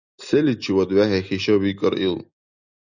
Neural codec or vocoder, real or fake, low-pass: none; real; 7.2 kHz